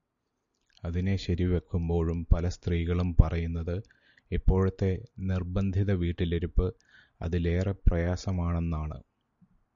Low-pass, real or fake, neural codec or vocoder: 7.2 kHz; real; none